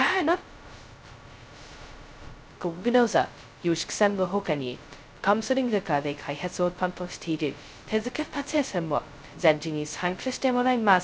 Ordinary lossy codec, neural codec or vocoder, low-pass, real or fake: none; codec, 16 kHz, 0.2 kbps, FocalCodec; none; fake